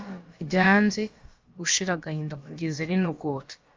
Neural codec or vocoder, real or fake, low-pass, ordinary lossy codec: codec, 16 kHz, about 1 kbps, DyCAST, with the encoder's durations; fake; 7.2 kHz; Opus, 32 kbps